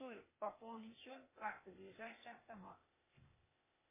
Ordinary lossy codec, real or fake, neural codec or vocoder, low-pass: MP3, 16 kbps; fake; codec, 16 kHz, 0.8 kbps, ZipCodec; 3.6 kHz